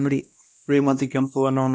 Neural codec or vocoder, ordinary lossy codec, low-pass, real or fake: codec, 16 kHz, 1 kbps, X-Codec, WavLM features, trained on Multilingual LibriSpeech; none; none; fake